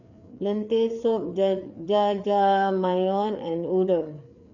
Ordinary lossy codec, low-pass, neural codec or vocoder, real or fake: none; 7.2 kHz; codec, 16 kHz, 4 kbps, FreqCodec, larger model; fake